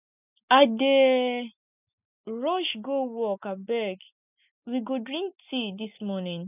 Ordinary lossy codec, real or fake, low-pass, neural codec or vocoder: none; real; 3.6 kHz; none